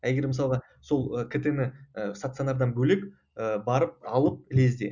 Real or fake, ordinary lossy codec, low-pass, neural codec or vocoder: real; none; 7.2 kHz; none